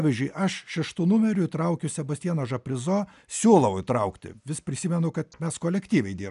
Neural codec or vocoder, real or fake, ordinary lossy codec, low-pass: none; real; MP3, 96 kbps; 10.8 kHz